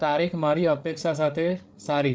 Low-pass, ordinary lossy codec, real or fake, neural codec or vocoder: none; none; fake; codec, 16 kHz, 8 kbps, FreqCodec, larger model